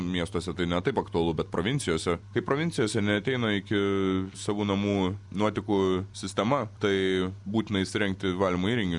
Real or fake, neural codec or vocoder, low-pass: real; none; 10.8 kHz